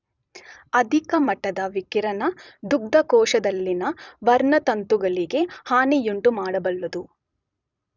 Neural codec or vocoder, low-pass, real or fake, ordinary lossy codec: vocoder, 44.1 kHz, 128 mel bands every 256 samples, BigVGAN v2; 7.2 kHz; fake; none